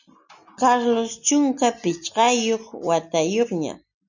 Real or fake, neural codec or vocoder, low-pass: real; none; 7.2 kHz